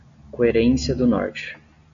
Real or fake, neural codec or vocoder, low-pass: real; none; 7.2 kHz